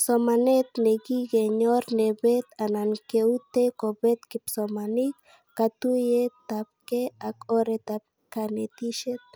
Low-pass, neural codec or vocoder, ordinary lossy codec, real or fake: none; none; none; real